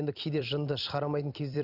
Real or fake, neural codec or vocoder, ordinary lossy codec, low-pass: fake; vocoder, 44.1 kHz, 128 mel bands every 256 samples, BigVGAN v2; none; 5.4 kHz